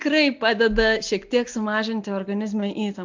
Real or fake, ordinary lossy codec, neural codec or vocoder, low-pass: real; MP3, 64 kbps; none; 7.2 kHz